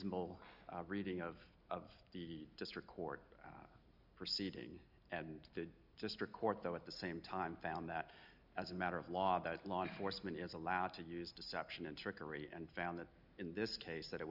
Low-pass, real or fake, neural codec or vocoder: 5.4 kHz; real; none